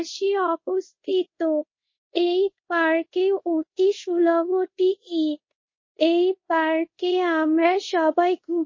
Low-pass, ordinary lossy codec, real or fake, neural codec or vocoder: 7.2 kHz; MP3, 32 kbps; fake; codec, 24 kHz, 0.5 kbps, DualCodec